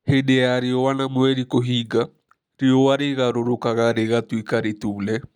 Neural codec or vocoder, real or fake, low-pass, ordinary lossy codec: none; real; 19.8 kHz; none